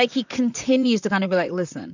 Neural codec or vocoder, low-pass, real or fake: vocoder, 44.1 kHz, 128 mel bands, Pupu-Vocoder; 7.2 kHz; fake